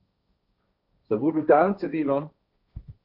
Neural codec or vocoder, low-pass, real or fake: codec, 16 kHz, 1.1 kbps, Voila-Tokenizer; 5.4 kHz; fake